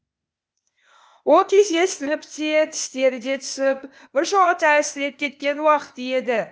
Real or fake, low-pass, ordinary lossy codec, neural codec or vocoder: fake; none; none; codec, 16 kHz, 0.8 kbps, ZipCodec